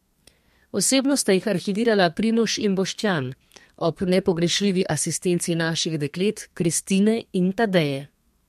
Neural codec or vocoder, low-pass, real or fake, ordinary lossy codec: codec, 32 kHz, 1.9 kbps, SNAC; 14.4 kHz; fake; MP3, 64 kbps